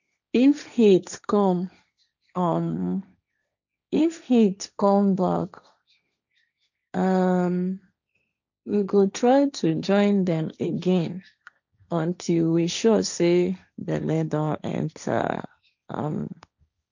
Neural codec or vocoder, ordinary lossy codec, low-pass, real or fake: codec, 16 kHz, 1.1 kbps, Voila-Tokenizer; none; 7.2 kHz; fake